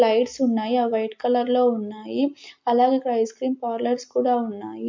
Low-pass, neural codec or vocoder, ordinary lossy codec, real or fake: 7.2 kHz; none; MP3, 48 kbps; real